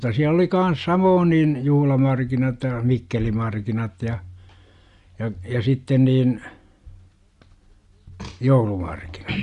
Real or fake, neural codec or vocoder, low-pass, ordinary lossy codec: real; none; 10.8 kHz; Opus, 64 kbps